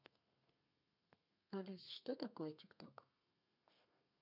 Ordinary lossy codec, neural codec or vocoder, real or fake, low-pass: none; codec, 32 kHz, 1.9 kbps, SNAC; fake; 5.4 kHz